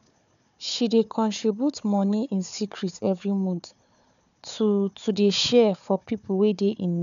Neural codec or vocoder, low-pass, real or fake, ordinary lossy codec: codec, 16 kHz, 4 kbps, FunCodec, trained on Chinese and English, 50 frames a second; 7.2 kHz; fake; MP3, 96 kbps